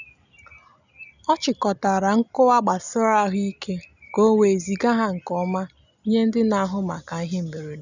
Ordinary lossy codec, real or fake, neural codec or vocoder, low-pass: none; real; none; 7.2 kHz